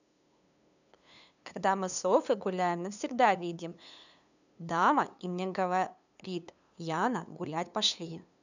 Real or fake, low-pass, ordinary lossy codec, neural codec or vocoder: fake; 7.2 kHz; none; codec, 16 kHz, 2 kbps, FunCodec, trained on LibriTTS, 25 frames a second